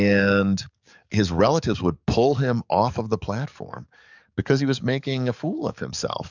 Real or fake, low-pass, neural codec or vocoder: fake; 7.2 kHz; codec, 44.1 kHz, 7.8 kbps, DAC